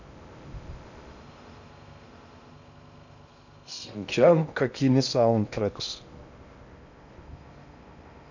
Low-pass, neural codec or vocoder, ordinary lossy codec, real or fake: 7.2 kHz; codec, 16 kHz in and 24 kHz out, 0.6 kbps, FocalCodec, streaming, 2048 codes; none; fake